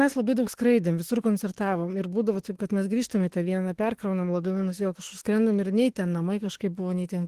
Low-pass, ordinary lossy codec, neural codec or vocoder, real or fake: 14.4 kHz; Opus, 16 kbps; autoencoder, 48 kHz, 32 numbers a frame, DAC-VAE, trained on Japanese speech; fake